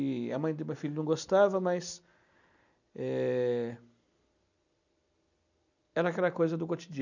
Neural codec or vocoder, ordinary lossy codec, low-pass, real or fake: none; none; 7.2 kHz; real